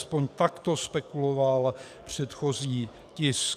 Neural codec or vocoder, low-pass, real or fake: codec, 44.1 kHz, 7.8 kbps, DAC; 14.4 kHz; fake